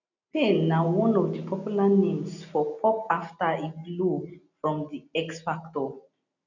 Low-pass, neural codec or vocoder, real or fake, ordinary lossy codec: 7.2 kHz; none; real; none